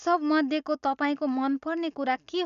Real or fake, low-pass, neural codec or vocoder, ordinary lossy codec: real; 7.2 kHz; none; none